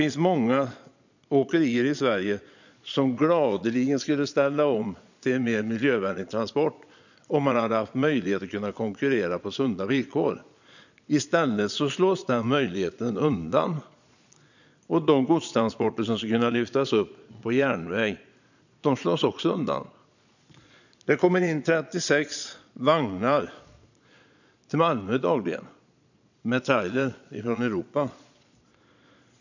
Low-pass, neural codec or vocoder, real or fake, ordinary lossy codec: 7.2 kHz; vocoder, 22.05 kHz, 80 mel bands, Vocos; fake; none